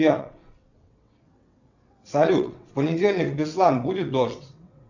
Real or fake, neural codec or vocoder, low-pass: fake; vocoder, 22.05 kHz, 80 mel bands, WaveNeXt; 7.2 kHz